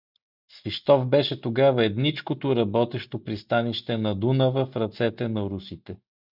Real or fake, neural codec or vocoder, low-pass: real; none; 5.4 kHz